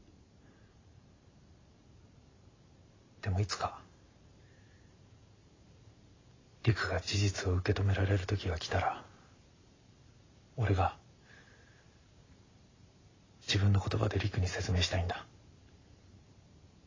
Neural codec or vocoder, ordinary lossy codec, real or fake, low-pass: vocoder, 44.1 kHz, 128 mel bands every 512 samples, BigVGAN v2; AAC, 32 kbps; fake; 7.2 kHz